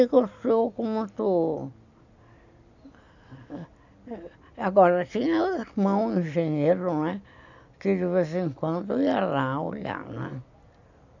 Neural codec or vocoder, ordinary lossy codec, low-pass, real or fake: none; none; 7.2 kHz; real